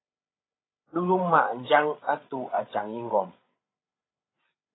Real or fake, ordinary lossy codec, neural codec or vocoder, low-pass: fake; AAC, 16 kbps; codec, 16 kHz, 8 kbps, FreqCodec, larger model; 7.2 kHz